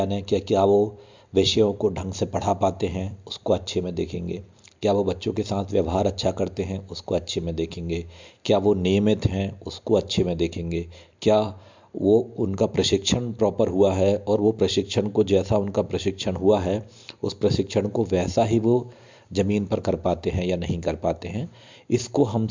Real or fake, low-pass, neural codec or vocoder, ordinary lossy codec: real; 7.2 kHz; none; MP3, 64 kbps